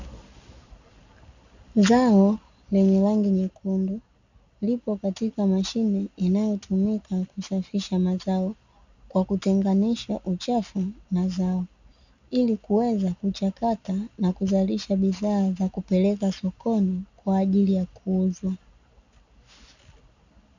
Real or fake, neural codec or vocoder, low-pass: real; none; 7.2 kHz